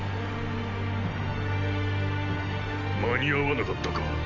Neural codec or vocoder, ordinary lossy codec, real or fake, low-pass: none; none; real; 7.2 kHz